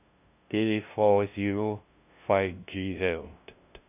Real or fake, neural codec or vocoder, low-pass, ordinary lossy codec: fake; codec, 16 kHz, 0.5 kbps, FunCodec, trained on LibriTTS, 25 frames a second; 3.6 kHz; none